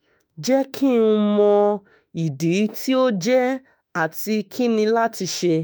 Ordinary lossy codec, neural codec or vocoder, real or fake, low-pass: none; autoencoder, 48 kHz, 32 numbers a frame, DAC-VAE, trained on Japanese speech; fake; none